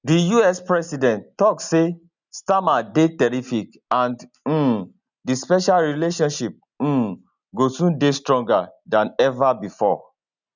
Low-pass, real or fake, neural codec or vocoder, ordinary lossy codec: 7.2 kHz; real; none; none